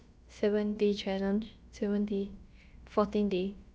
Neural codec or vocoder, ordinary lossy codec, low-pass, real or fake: codec, 16 kHz, about 1 kbps, DyCAST, with the encoder's durations; none; none; fake